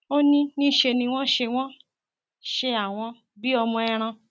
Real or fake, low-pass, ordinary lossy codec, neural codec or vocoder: real; none; none; none